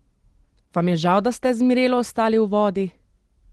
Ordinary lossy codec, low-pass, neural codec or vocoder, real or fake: Opus, 16 kbps; 10.8 kHz; none; real